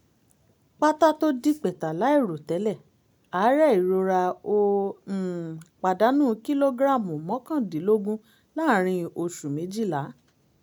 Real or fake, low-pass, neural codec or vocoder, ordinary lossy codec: real; none; none; none